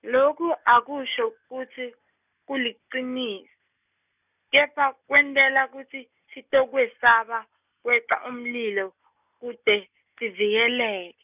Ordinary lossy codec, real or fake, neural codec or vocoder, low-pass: none; real; none; 3.6 kHz